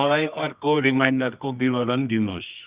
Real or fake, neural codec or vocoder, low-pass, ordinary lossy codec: fake; codec, 24 kHz, 0.9 kbps, WavTokenizer, medium music audio release; 3.6 kHz; Opus, 64 kbps